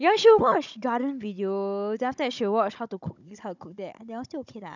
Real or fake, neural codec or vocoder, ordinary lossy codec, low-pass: fake; codec, 16 kHz, 16 kbps, FunCodec, trained on Chinese and English, 50 frames a second; none; 7.2 kHz